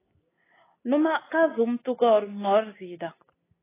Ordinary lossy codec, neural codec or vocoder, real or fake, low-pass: AAC, 16 kbps; none; real; 3.6 kHz